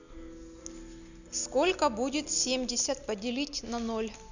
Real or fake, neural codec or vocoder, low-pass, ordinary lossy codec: real; none; 7.2 kHz; none